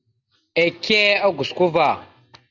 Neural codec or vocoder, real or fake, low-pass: none; real; 7.2 kHz